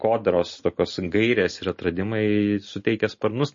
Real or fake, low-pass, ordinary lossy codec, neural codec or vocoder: real; 7.2 kHz; MP3, 32 kbps; none